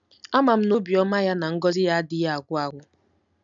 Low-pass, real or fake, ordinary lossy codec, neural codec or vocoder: 7.2 kHz; real; none; none